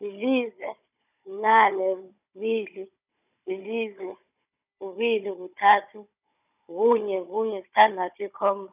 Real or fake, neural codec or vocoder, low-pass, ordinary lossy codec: fake; codec, 16 kHz, 16 kbps, FunCodec, trained on Chinese and English, 50 frames a second; 3.6 kHz; none